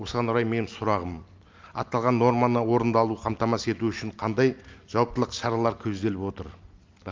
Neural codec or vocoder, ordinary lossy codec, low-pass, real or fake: none; Opus, 32 kbps; 7.2 kHz; real